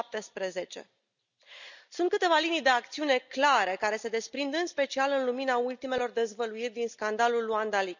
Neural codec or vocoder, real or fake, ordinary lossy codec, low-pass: none; real; none; 7.2 kHz